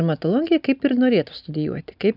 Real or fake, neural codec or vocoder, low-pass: real; none; 5.4 kHz